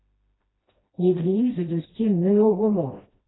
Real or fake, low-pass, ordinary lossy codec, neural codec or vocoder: fake; 7.2 kHz; AAC, 16 kbps; codec, 16 kHz, 1 kbps, FreqCodec, smaller model